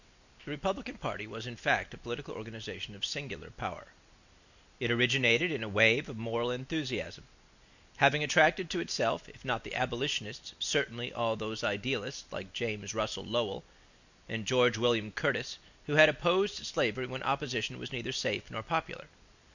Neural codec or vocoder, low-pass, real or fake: none; 7.2 kHz; real